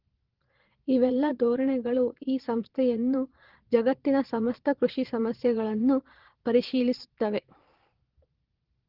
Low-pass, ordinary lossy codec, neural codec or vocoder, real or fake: 5.4 kHz; Opus, 16 kbps; vocoder, 22.05 kHz, 80 mel bands, Vocos; fake